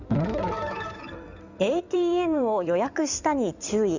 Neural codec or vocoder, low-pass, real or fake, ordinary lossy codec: codec, 16 kHz in and 24 kHz out, 2.2 kbps, FireRedTTS-2 codec; 7.2 kHz; fake; none